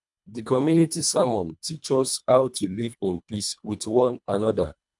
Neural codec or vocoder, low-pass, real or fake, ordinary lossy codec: codec, 24 kHz, 1.5 kbps, HILCodec; 10.8 kHz; fake; none